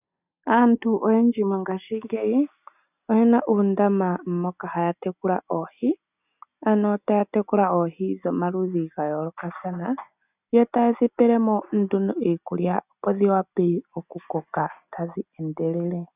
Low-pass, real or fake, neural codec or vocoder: 3.6 kHz; real; none